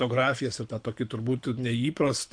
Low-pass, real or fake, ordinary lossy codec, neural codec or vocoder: 9.9 kHz; fake; MP3, 64 kbps; codec, 24 kHz, 6 kbps, HILCodec